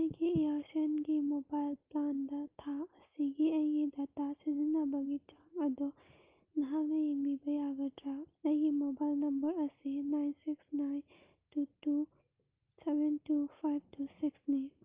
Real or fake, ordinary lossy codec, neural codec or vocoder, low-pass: real; Opus, 24 kbps; none; 3.6 kHz